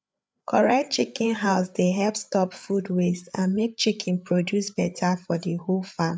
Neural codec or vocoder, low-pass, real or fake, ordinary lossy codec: codec, 16 kHz, 8 kbps, FreqCodec, larger model; none; fake; none